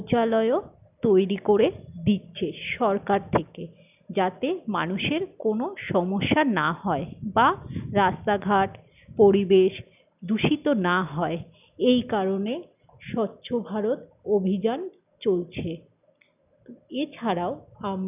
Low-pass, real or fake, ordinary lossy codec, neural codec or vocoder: 3.6 kHz; real; none; none